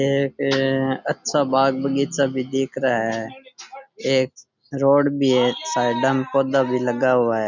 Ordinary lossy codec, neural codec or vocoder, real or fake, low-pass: none; none; real; 7.2 kHz